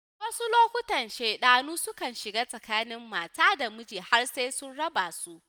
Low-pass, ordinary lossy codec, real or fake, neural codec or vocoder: none; none; real; none